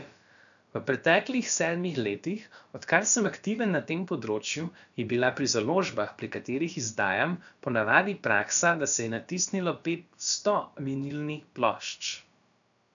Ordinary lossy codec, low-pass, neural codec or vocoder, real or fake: none; 7.2 kHz; codec, 16 kHz, about 1 kbps, DyCAST, with the encoder's durations; fake